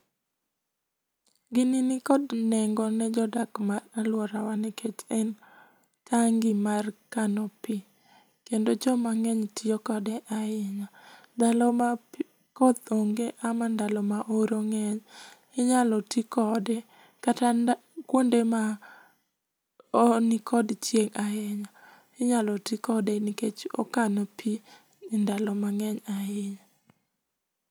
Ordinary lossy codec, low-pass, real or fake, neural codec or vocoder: none; none; real; none